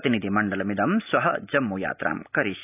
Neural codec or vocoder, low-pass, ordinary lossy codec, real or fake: none; 3.6 kHz; none; real